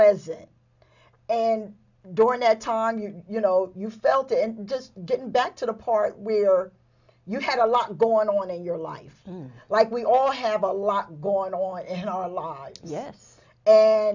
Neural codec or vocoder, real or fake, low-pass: none; real; 7.2 kHz